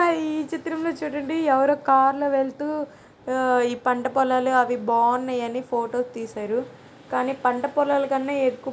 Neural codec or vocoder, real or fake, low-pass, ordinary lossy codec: none; real; none; none